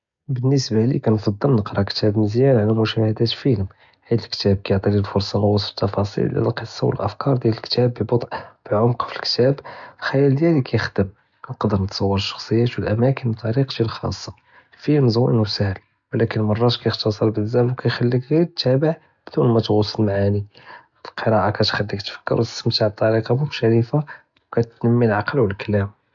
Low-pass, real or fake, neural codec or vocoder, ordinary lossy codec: 7.2 kHz; real; none; none